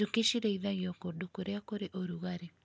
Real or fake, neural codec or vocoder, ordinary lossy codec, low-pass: real; none; none; none